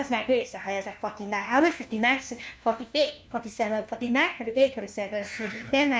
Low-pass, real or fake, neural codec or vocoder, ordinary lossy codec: none; fake; codec, 16 kHz, 1 kbps, FunCodec, trained on LibriTTS, 50 frames a second; none